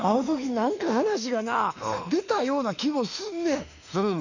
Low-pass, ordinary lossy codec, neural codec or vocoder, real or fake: 7.2 kHz; none; autoencoder, 48 kHz, 32 numbers a frame, DAC-VAE, trained on Japanese speech; fake